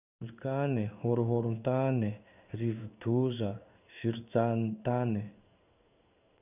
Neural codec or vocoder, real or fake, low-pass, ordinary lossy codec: codec, 16 kHz in and 24 kHz out, 1 kbps, XY-Tokenizer; fake; 3.6 kHz; none